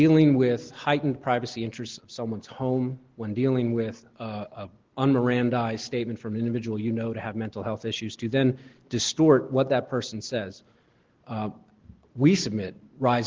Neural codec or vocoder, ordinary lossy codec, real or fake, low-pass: none; Opus, 16 kbps; real; 7.2 kHz